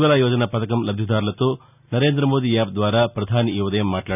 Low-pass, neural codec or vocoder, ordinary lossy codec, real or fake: 3.6 kHz; none; none; real